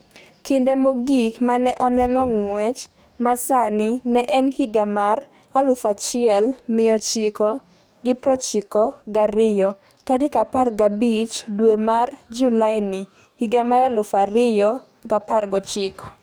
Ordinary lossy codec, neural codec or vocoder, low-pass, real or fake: none; codec, 44.1 kHz, 2.6 kbps, DAC; none; fake